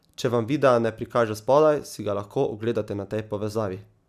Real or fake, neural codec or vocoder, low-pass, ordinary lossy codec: real; none; 14.4 kHz; none